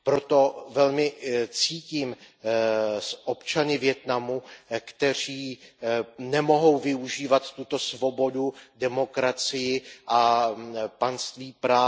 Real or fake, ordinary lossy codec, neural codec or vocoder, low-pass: real; none; none; none